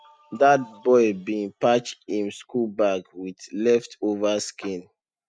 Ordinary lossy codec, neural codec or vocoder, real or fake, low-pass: none; none; real; 9.9 kHz